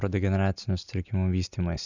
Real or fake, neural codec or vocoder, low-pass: real; none; 7.2 kHz